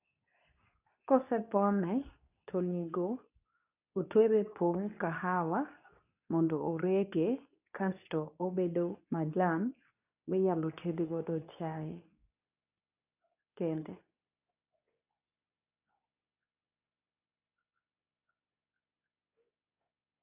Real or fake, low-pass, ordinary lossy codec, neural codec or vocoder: fake; 3.6 kHz; none; codec, 24 kHz, 0.9 kbps, WavTokenizer, medium speech release version 2